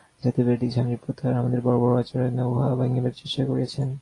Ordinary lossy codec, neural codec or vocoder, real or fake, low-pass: AAC, 32 kbps; none; real; 10.8 kHz